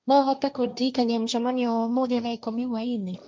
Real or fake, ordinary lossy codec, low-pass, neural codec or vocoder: fake; none; none; codec, 16 kHz, 1.1 kbps, Voila-Tokenizer